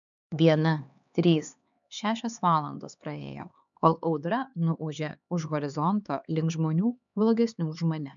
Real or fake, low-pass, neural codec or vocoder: fake; 7.2 kHz; codec, 16 kHz, 4 kbps, X-Codec, HuBERT features, trained on LibriSpeech